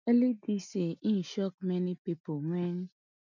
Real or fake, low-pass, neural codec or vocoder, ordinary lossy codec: real; 7.2 kHz; none; none